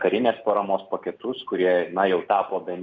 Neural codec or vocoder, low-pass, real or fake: none; 7.2 kHz; real